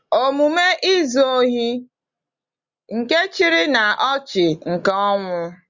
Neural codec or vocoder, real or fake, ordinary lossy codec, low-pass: none; real; Opus, 64 kbps; 7.2 kHz